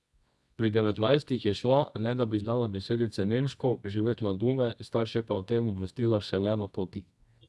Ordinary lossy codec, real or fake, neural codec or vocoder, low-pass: none; fake; codec, 24 kHz, 0.9 kbps, WavTokenizer, medium music audio release; none